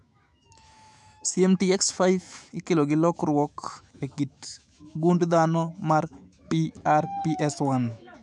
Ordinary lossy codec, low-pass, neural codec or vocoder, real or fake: none; 10.8 kHz; autoencoder, 48 kHz, 128 numbers a frame, DAC-VAE, trained on Japanese speech; fake